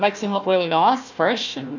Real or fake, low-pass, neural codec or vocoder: fake; 7.2 kHz; codec, 16 kHz, 1 kbps, FunCodec, trained on Chinese and English, 50 frames a second